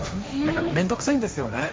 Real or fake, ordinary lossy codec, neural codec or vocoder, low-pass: fake; none; codec, 16 kHz, 1.1 kbps, Voila-Tokenizer; none